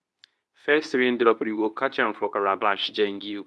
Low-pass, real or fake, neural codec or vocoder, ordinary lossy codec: none; fake; codec, 24 kHz, 0.9 kbps, WavTokenizer, medium speech release version 2; none